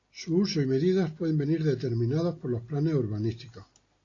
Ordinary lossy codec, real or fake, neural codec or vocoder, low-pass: AAC, 32 kbps; real; none; 7.2 kHz